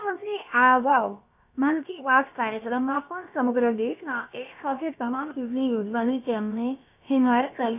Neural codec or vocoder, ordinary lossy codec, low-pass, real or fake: codec, 16 kHz, about 1 kbps, DyCAST, with the encoder's durations; none; 3.6 kHz; fake